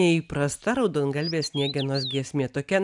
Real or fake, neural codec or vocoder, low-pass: real; none; 10.8 kHz